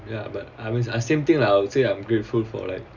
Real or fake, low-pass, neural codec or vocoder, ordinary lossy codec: real; 7.2 kHz; none; none